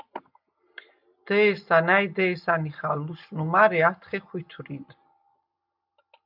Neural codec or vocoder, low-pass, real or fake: none; 5.4 kHz; real